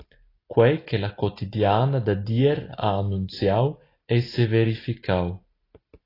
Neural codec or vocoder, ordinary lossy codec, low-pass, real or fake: none; AAC, 24 kbps; 5.4 kHz; real